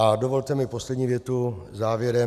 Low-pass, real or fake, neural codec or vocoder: 14.4 kHz; real; none